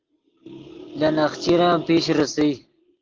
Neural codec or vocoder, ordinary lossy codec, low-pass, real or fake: none; Opus, 16 kbps; 7.2 kHz; real